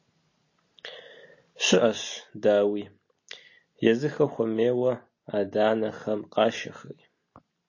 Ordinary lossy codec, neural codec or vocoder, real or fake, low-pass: MP3, 32 kbps; none; real; 7.2 kHz